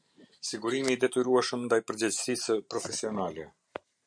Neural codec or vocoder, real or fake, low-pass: vocoder, 44.1 kHz, 128 mel bands every 512 samples, BigVGAN v2; fake; 9.9 kHz